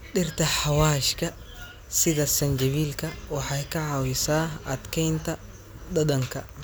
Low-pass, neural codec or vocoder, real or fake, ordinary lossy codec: none; none; real; none